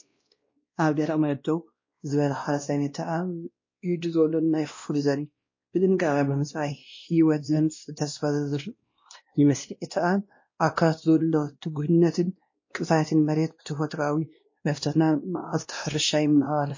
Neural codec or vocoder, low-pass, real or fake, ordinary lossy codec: codec, 16 kHz, 1 kbps, X-Codec, WavLM features, trained on Multilingual LibriSpeech; 7.2 kHz; fake; MP3, 32 kbps